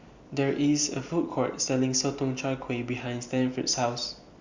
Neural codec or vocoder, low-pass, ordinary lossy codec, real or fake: none; 7.2 kHz; Opus, 64 kbps; real